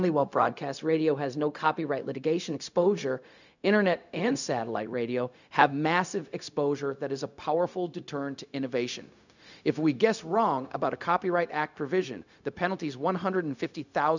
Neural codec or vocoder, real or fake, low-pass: codec, 16 kHz, 0.4 kbps, LongCat-Audio-Codec; fake; 7.2 kHz